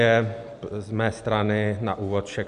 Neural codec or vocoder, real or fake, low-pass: none; real; 10.8 kHz